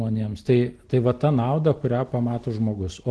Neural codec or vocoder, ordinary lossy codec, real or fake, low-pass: none; Opus, 16 kbps; real; 10.8 kHz